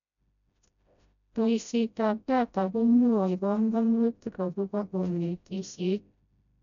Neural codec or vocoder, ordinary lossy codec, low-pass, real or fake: codec, 16 kHz, 0.5 kbps, FreqCodec, smaller model; none; 7.2 kHz; fake